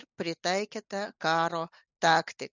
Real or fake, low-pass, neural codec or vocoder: real; 7.2 kHz; none